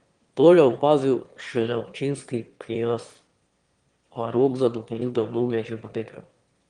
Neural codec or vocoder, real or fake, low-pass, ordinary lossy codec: autoencoder, 22.05 kHz, a latent of 192 numbers a frame, VITS, trained on one speaker; fake; 9.9 kHz; Opus, 24 kbps